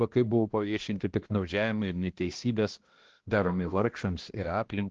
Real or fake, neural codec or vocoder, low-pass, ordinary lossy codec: fake; codec, 16 kHz, 1 kbps, X-Codec, HuBERT features, trained on balanced general audio; 7.2 kHz; Opus, 16 kbps